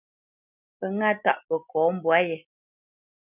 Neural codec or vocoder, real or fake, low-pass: none; real; 3.6 kHz